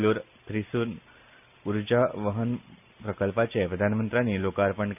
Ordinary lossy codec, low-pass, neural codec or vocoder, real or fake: none; 3.6 kHz; none; real